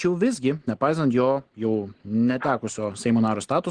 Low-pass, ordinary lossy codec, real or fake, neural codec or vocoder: 9.9 kHz; Opus, 16 kbps; real; none